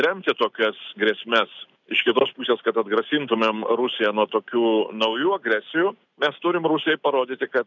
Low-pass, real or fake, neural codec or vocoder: 7.2 kHz; real; none